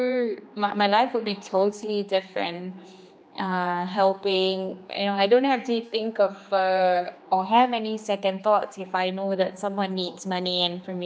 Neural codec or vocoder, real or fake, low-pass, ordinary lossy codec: codec, 16 kHz, 2 kbps, X-Codec, HuBERT features, trained on general audio; fake; none; none